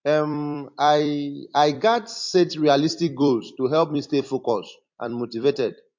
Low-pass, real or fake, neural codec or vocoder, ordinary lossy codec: 7.2 kHz; fake; vocoder, 44.1 kHz, 128 mel bands every 256 samples, BigVGAN v2; MP3, 48 kbps